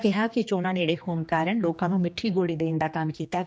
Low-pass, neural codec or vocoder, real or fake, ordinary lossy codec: none; codec, 16 kHz, 2 kbps, X-Codec, HuBERT features, trained on general audio; fake; none